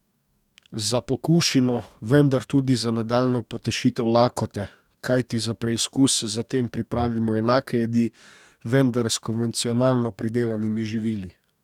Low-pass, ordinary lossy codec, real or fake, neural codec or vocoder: 19.8 kHz; none; fake; codec, 44.1 kHz, 2.6 kbps, DAC